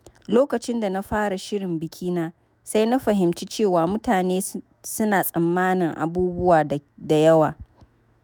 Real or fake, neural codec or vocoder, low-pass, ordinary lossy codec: fake; autoencoder, 48 kHz, 128 numbers a frame, DAC-VAE, trained on Japanese speech; none; none